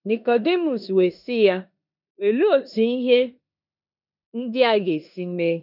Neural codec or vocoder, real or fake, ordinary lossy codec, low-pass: codec, 16 kHz in and 24 kHz out, 0.9 kbps, LongCat-Audio-Codec, four codebook decoder; fake; none; 5.4 kHz